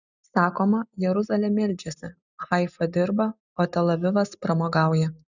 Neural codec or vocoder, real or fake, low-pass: none; real; 7.2 kHz